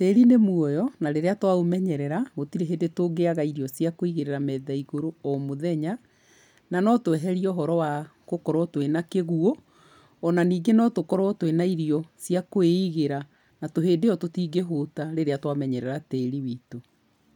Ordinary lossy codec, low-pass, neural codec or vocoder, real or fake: none; 19.8 kHz; none; real